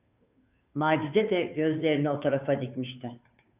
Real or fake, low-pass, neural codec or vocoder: fake; 3.6 kHz; codec, 16 kHz, 2 kbps, FunCodec, trained on Chinese and English, 25 frames a second